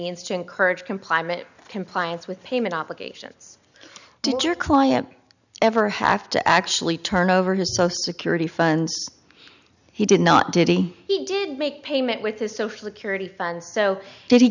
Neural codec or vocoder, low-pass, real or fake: none; 7.2 kHz; real